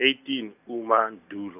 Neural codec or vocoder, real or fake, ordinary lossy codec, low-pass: none; real; none; 3.6 kHz